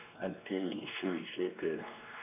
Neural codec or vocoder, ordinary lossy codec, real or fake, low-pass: codec, 16 kHz, 2 kbps, X-Codec, WavLM features, trained on Multilingual LibriSpeech; none; fake; 3.6 kHz